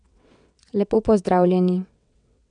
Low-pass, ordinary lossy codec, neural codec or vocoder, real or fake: 9.9 kHz; none; vocoder, 22.05 kHz, 80 mel bands, WaveNeXt; fake